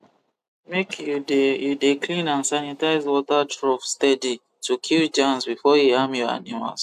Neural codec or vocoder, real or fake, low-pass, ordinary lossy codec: none; real; 14.4 kHz; AAC, 96 kbps